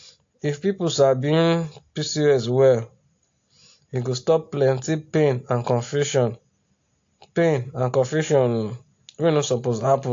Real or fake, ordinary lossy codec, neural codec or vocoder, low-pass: real; AAC, 48 kbps; none; 7.2 kHz